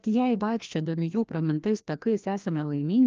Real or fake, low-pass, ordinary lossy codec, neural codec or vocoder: fake; 7.2 kHz; Opus, 24 kbps; codec, 16 kHz, 1 kbps, FreqCodec, larger model